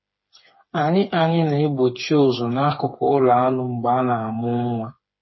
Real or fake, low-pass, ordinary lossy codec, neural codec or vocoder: fake; 7.2 kHz; MP3, 24 kbps; codec, 16 kHz, 4 kbps, FreqCodec, smaller model